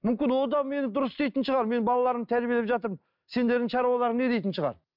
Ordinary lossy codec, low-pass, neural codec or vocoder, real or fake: none; 5.4 kHz; none; real